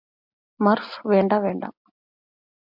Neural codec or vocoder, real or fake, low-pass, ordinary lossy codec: none; real; 5.4 kHz; MP3, 48 kbps